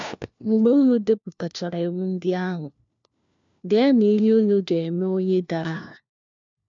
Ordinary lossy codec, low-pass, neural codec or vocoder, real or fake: MP3, 64 kbps; 7.2 kHz; codec, 16 kHz, 1 kbps, FunCodec, trained on LibriTTS, 50 frames a second; fake